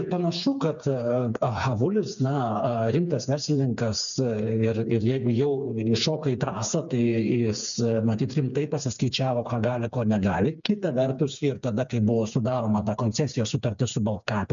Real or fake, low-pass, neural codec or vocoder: fake; 7.2 kHz; codec, 16 kHz, 4 kbps, FreqCodec, smaller model